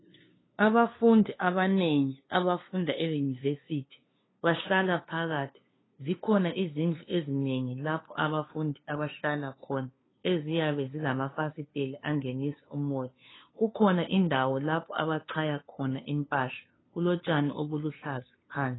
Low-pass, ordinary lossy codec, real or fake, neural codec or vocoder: 7.2 kHz; AAC, 16 kbps; fake; codec, 16 kHz, 2 kbps, FunCodec, trained on LibriTTS, 25 frames a second